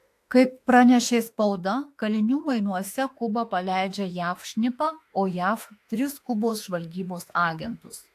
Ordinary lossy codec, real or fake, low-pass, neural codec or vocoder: AAC, 64 kbps; fake; 14.4 kHz; autoencoder, 48 kHz, 32 numbers a frame, DAC-VAE, trained on Japanese speech